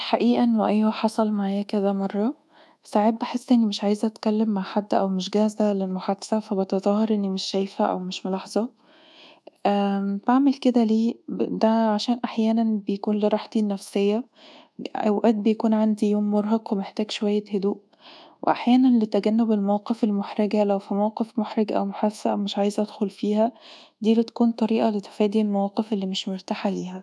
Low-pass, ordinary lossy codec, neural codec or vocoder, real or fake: none; none; codec, 24 kHz, 1.2 kbps, DualCodec; fake